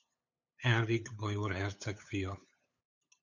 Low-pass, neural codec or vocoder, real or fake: 7.2 kHz; codec, 16 kHz, 8 kbps, FunCodec, trained on LibriTTS, 25 frames a second; fake